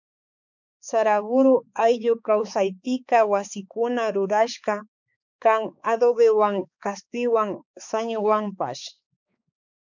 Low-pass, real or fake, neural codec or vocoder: 7.2 kHz; fake; codec, 16 kHz, 4 kbps, X-Codec, HuBERT features, trained on balanced general audio